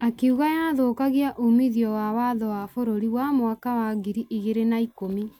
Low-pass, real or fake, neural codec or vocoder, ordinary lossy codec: 19.8 kHz; real; none; none